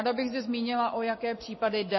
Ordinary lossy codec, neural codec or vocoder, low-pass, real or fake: MP3, 24 kbps; vocoder, 44.1 kHz, 128 mel bands every 256 samples, BigVGAN v2; 7.2 kHz; fake